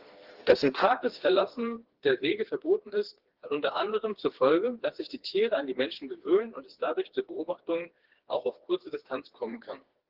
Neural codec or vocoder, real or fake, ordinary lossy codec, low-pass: codec, 16 kHz, 2 kbps, FreqCodec, smaller model; fake; Opus, 16 kbps; 5.4 kHz